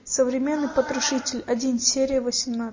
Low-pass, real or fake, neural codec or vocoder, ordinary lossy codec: 7.2 kHz; real; none; MP3, 32 kbps